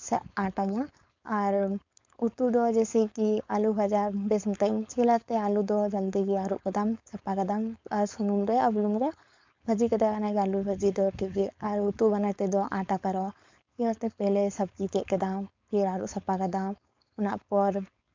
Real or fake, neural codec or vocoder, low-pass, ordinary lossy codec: fake; codec, 16 kHz, 4.8 kbps, FACodec; 7.2 kHz; MP3, 64 kbps